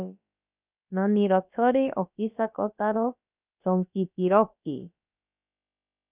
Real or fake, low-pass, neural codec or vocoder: fake; 3.6 kHz; codec, 16 kHz, about 1 kbps, DyCAST, with the encoder's durations